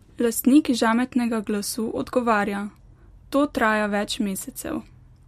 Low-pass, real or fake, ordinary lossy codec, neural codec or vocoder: 14.4 kHz; real; MP3, 64 kbps; none